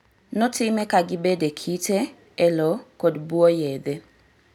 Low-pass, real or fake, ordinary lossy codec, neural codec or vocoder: 19.8 kHz; real; none; none